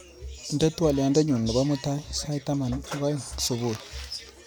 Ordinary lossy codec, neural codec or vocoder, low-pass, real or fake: none; none; none; real